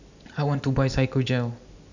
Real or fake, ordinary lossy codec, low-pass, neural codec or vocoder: real; none; 7.2 kHz; none